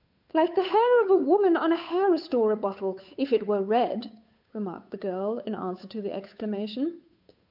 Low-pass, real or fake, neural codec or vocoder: 5.4 kHz; fake; codec, 16 kHz, 8 kbps, FunCodec, trained on Chinese and English, 25 frames a second